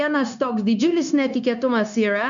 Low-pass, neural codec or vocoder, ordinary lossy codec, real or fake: 7.2 kHz; codec, 16 kHz, 0.9 kbps, LongCat-Audio-Codec; MP3, 96 kbps; fake